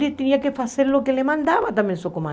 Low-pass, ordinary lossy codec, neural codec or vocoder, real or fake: none; none; none; real